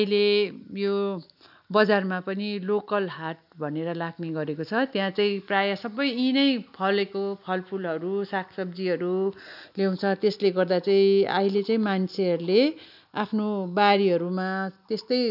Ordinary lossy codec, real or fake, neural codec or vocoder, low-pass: none; real; none; 5.4 kHz